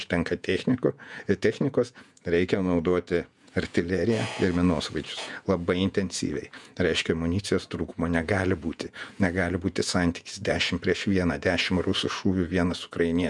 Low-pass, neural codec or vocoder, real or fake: 10.8 kHz; vocoder, 48 kHz, 128 mel bands, Vocos; fake